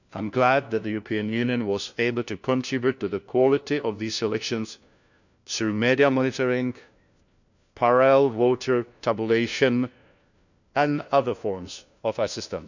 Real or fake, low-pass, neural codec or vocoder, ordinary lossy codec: fake; 7.2 kHz; codec, 16 kHz, 1 kbps, FunCodec, trained on LibriTTS, 50 frames a second; none